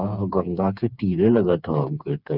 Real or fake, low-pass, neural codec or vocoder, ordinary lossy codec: fake; 5.4 kHz; codec, 16 kHz, 4 kbps, FreqCodec, smaller model; none